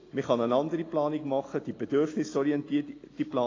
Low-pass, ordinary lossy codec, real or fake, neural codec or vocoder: 7.2 kHz; AAC, 32 kbps; real; none